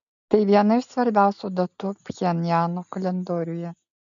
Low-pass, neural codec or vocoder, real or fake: 7.2 kHz; none; real